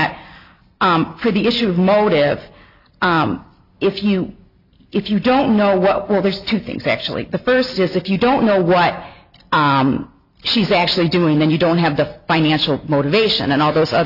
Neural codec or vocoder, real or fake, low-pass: none; real; 5.4 kHz